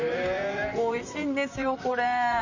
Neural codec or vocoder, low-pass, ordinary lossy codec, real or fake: vocoder, 44.1 kHz, 128 mel bands, Pupu-Vocoder; 7.2 kHz; Opus, 64 kbps; fake